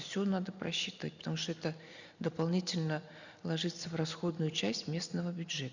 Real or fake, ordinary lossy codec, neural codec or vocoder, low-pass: real; none; none; 7.2 kHz